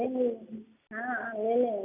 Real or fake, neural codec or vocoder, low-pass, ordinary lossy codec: real; none; 3.6 kHz; none